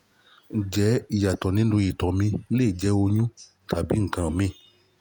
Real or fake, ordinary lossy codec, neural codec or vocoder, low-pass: fake; none; vocoder, 48 kHz, 128 mel bands, Vocos; none